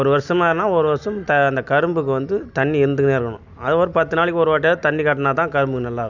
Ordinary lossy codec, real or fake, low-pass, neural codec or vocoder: none; real; 7.2 kHz; none